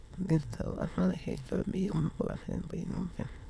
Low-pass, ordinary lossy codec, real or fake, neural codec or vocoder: none; none; fake; autoencoder, 22.05 kHz, a latent of 192 numbers a frame, VITS, trained on many speakers